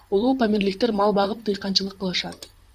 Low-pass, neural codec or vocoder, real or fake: 14.4 kHz; vocoder, 44.1 kHz, 128 mel bands, Pupu-Vocoder; fake